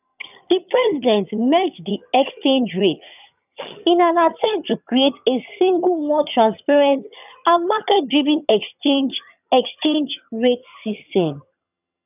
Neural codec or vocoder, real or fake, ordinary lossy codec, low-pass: vocoder, 22.05 kHz, 80 mel bands, HiFi-GAN; fake; none; 3.6 kHz